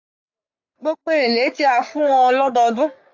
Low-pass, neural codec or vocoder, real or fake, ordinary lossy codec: 7.2 kHz; codec, 44.1 kHz, 3.4 kbps, Pupu-Codec; fake; AAC, 48 kbps